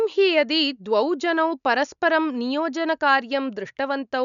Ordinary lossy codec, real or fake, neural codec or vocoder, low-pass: none; real; none; 7.2 kHz